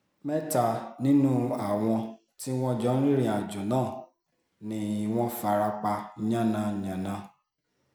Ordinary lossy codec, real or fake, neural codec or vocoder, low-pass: none; real; none; none